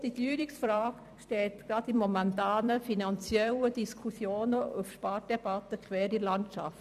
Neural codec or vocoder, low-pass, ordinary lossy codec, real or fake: vocoder, 44.1 kHz, 128 mel bands every 256 samples, BigVGAN v2; 14.4 kHz; none; fake